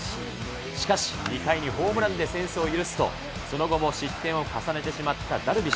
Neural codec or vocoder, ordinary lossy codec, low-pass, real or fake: none; none; none; real